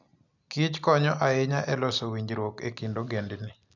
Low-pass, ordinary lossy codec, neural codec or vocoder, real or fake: 7.2 kHz; none; none; real